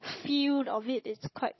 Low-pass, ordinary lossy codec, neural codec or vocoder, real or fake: 7.2 kHz; MP3, 24 kbps; codec, 16 kHz, 16 kbps, FunCodec, trained on Chinese and English, 50 frames a second; fake